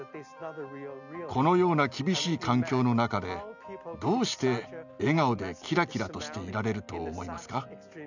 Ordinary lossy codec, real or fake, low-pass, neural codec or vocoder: none; real; 7.2 kHz; none